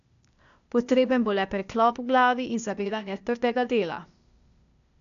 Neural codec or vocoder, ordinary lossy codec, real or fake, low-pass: codec, 16 kHz, 0.8 kbps, ZipCodec; none; fake; 7.2 kHz